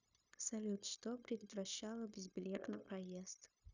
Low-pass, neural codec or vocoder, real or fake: 7.2 kHz; codec, 16 kHz, 0.9 kbps, LongCat-Audio-Codec; fake